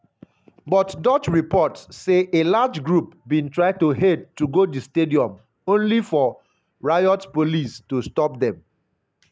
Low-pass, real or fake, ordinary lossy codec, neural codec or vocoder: none; real; none; none